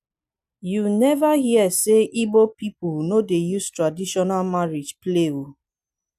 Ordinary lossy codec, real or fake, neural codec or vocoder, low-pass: none; real; none; 14.4 kHz